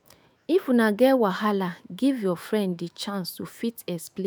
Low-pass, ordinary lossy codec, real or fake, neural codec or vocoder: none; none; fake; autoencoder, 48 kHz, 128 numbers a frame, DAC-VAE, trained on Japanese speech